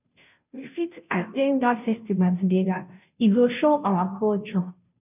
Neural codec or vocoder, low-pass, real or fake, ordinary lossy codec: codec, 16 kHz, 0.5 kbps, FunCodec, trained on Chinese and English, 25 frames a second; 3.6 kHz; fake; none